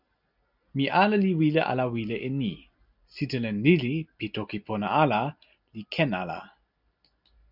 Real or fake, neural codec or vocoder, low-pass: real; none; 5.4 kHz